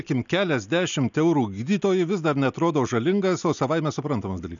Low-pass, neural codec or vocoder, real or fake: 7.2 kHz; none; real